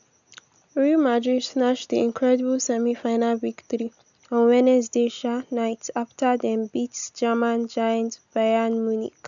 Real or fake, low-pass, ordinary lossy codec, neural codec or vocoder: real; 7.2 kHz; none; none